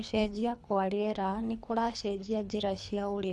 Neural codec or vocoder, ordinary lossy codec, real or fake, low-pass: codec, 24 kHz, 3 kbps, HILCodec; none; fake; none